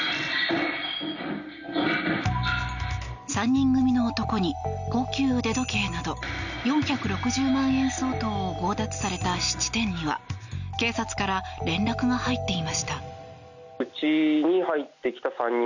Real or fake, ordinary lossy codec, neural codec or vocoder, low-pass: real; none; none; 7.2 kHz